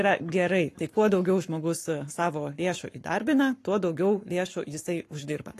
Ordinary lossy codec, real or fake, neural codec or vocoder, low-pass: AAC, 48 kbps; fake; codec, 44.1 kHz, 7.8 kbps, Pupu-Codec; 14.4 kHz